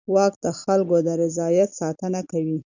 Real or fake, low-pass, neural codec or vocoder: real; 7.2 kHz; none